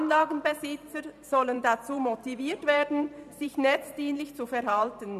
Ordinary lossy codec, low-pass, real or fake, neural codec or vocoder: none; 14.4 kHz; fake; vocoder, 44.1 kHz, 128 mel bands every 512 samples, BigVGAN v2